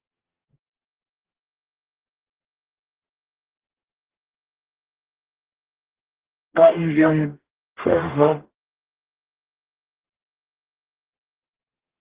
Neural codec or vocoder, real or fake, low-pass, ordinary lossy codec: codec, 44.1 kHz, 0.9 kbps, DAC; fake; 3.6 kHz; Opus, 16 kbps